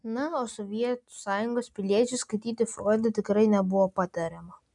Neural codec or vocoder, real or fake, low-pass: none; real; 10.8 kHz